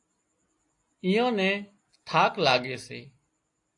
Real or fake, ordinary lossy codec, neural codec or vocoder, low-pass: real; AAC, 48 kbps; none; 10.8 kHz